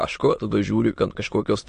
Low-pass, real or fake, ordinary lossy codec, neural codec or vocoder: 9.9 kHz; fake; MP3, 48 kbps; autoencoder, 22.05 kHz, a latent of 192 numbers a frame, VITS, trained on many speakers